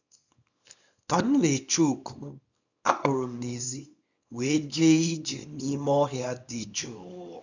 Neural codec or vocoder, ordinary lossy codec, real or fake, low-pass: codec, 24 kHz, 0.9 kbps, WavTokenizer, small release; none; fake; 7.2 kHz